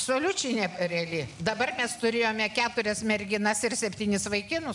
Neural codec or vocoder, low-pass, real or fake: none; 10.8 kHz; real